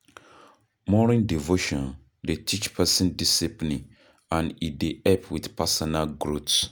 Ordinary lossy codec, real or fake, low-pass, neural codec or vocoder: none; real; none; none